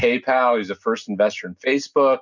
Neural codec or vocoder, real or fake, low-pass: none; real; 7.2 kHz